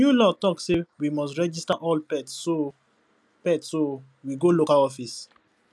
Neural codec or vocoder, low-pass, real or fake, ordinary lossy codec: none; none; real; none